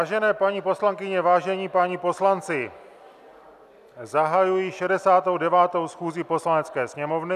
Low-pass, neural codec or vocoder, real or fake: 14.4 kHz; none; real